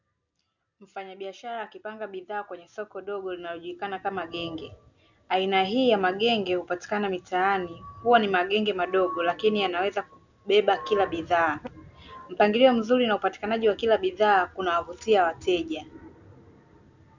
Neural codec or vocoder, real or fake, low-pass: none; real; 7.2 kHz